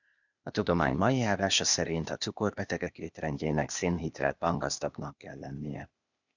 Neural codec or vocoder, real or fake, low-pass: codec, 16 kHz, 0.8 kbps, ZipCodec; fake; 7.2 kHz